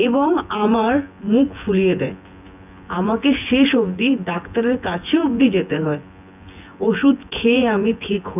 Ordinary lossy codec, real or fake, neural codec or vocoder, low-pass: none; fake; vocoder, 24 kHz, 100 mel bands, Vocos; 3.6 kHz